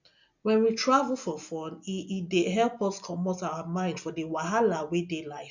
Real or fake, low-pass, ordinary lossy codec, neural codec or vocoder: real; 7.2 kHz; none; none